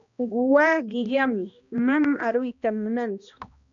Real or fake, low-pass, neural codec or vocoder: fake; 7.2 kHz; codec, 16 kHz, 1 kbps, X-Codec, HuBERT features, trained on balanced general audio